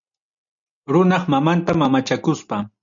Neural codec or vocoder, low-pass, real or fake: none; 7.2 kHz; real